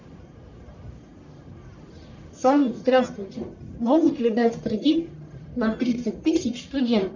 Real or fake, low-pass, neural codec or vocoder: fake; 7.2 kHz; codec, 44.1 kHz, 1.7 kbps, Pupu-Codec